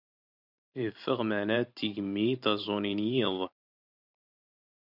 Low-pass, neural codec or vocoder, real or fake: 5.4 kHz; vocoder, 44.1 kHz, 128 mel bands every 512 samples, BigVGAN v2; fake